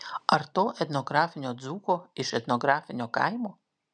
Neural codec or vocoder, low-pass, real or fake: none; 9.9 kHz; real